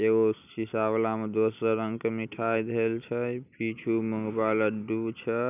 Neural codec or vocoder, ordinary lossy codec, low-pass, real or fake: none; none; 3.6 kHz; real